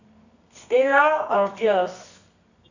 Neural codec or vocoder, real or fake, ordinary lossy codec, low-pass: codec, 24 kHz, 0.9 kbps, WavTokenizer, medium music audio release; fake; none; 7.2 kHz